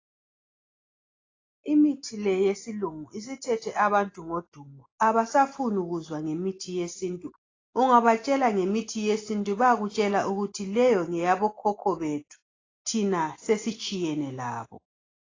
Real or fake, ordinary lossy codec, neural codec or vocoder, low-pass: real; AAC, 32 kbps; none; 7.2 kHz